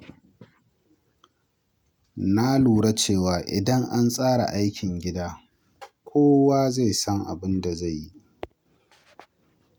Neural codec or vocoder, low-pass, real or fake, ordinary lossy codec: none; none; real; none